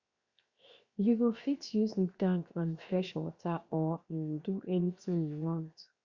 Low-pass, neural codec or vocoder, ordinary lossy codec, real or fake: 7.2 kHz; codec, 16 kHz, 0.7 kbps, FocalCodec; Opus, 64 kbps; fake